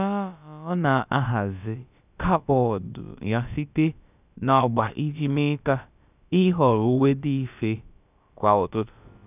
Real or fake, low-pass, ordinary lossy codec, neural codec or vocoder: fake; 3.6 kHz; none; codec, 16 kHz, about 1 kbps, DyCAST, with the encoder's durations